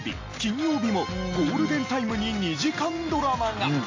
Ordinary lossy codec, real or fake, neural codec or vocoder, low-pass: AAC, 32 kbps; real; none; 7.2 kHz